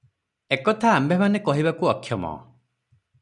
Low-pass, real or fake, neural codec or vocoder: 10.8 kHz; real; none